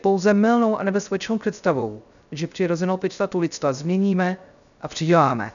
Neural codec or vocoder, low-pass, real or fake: codec, 16 kHz, 0.3 kbps, FocalCodec; 7.2 kHz; fake